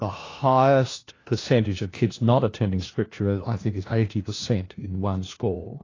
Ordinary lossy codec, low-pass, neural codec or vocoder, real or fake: AAC, 32 kbps; 7.2 kHz; codec, 16 kHz, 1 kbps, FunCodec, trained on LibriTTS, 50 frames a second; fake